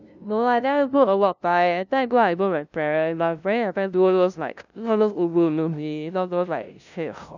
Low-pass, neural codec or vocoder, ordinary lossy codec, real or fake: 7.2 kHz; codec, 16 kHz, 0.5 kbps, FunCodec, trained on LibriTTS, 25 frames a second; none; fake